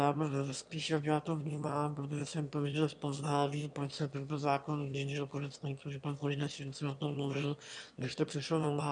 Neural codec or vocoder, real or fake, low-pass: autoencoder, 22.05 kHz, a latent of 192 numbers a frame, VITS, trained on one speaker; fake; 9.9 kHz